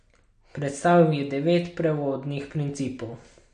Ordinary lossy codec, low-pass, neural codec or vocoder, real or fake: MP3, 48 kbps; 9.9 kHz; none; real